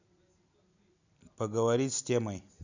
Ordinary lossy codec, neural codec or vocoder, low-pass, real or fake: none; none; 7.2 kHz; real